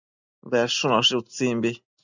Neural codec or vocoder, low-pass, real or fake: none; 7.2 kHz; real